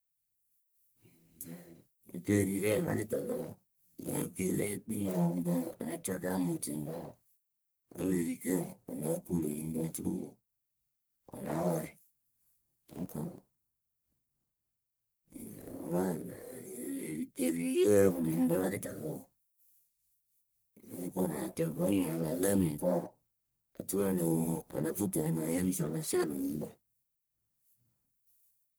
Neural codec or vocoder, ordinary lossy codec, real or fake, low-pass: codec, 44.1 kHz, 1.7 kbps, Pupu-Codec; none; fake; none